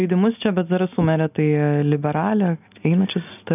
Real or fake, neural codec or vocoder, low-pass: real; none; 3.6 kHz